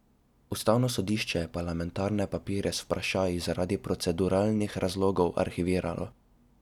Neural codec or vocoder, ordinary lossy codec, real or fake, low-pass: none; none; real; 19.8 kHz